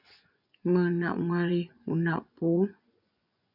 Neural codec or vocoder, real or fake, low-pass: vocoder, 24 kHz, 100 mel bands, Vocos; fake; 5.4 kHz